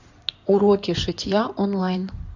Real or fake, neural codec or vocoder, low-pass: fake; codec, 16 kHz in and 24 kHz out, 2.2 kbps, FireRedTTS-2 codec; 7.2 kHz